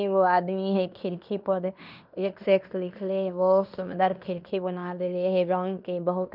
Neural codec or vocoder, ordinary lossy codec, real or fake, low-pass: codec, 16 kHz in and 24 kHz out, 0.9 kbps, LongCat-Audio-Codec, fine tuned four codebook decoder; none; fake; 5.4 kHz